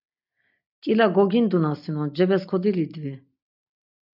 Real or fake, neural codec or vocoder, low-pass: real; none; 5.4 kHz